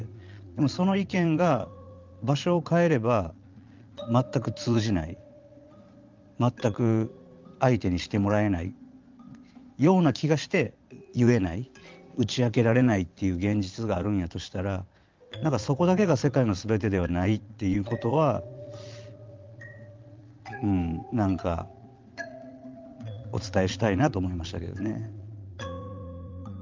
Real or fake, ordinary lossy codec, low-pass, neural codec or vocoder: fake; Opus, 32 kbps; 7.2 kHz; vocoder, 22.05 kHz, 80 mel bands, Vocos